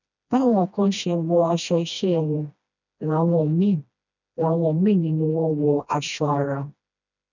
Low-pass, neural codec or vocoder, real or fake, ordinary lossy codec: 7.2 kHz; codec, 16 kHz, 1 kbps, FreqCodec, smaller model; fake; none